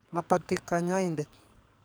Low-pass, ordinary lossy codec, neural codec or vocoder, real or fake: none; none; codec, 44.1 kHz, 2.6 kbps, SNAC; fake